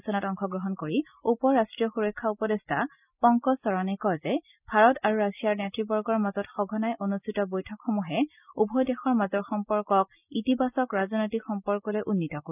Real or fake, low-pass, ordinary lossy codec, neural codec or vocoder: real; 3.6 kHz; none; none